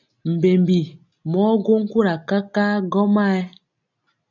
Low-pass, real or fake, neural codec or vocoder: 7.2 kHz; real; none